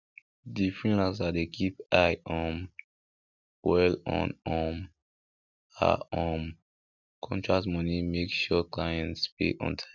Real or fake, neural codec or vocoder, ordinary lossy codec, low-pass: real; none; none; 7.2 kHz